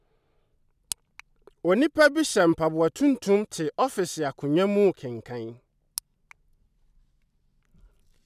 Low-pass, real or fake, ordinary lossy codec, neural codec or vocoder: 14.4 kHz; real; none; none